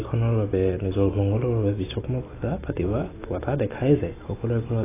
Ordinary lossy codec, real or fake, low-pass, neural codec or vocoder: AAC, 16 kbps; real; 3.6 kHz; none